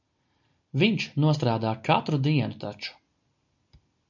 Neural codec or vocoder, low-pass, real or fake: none; 7.2 kHz; real